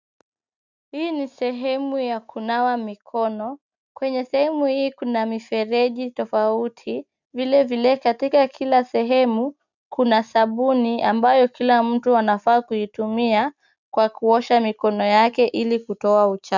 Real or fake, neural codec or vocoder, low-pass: real; none; 7.2 kHz